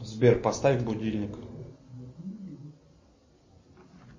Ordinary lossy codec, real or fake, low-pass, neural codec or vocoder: MP3, 32 kbps; real; 7.2 kHz; none